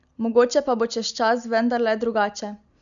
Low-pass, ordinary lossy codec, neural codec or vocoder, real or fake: 7.2 kHz; none; none; real